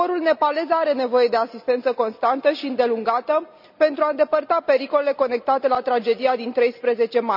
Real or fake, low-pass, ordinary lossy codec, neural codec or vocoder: real; 5.4 kHz; none; none